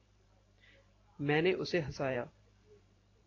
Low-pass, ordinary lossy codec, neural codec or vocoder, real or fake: 7.2 kHz; AAC, 32 kbps; vocoder, 44.1 kHz, 128 mel bands every 512 samples, BigVGAN v2; fake